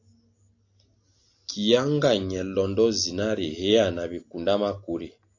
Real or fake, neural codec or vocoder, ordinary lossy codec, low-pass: real; none; AAC, 48 kbps; 7.2 kHz